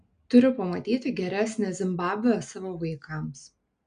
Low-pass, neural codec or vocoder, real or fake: 9.9 kHz; none; real